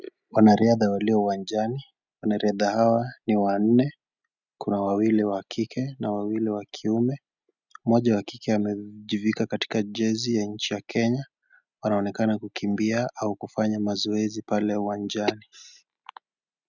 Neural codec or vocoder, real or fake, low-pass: none; real; 7.2 kHz